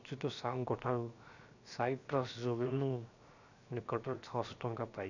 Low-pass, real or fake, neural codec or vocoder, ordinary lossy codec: 7.2 kHz; fake; codec, 16 kHz, 0.7 kbps, FocalCodec; none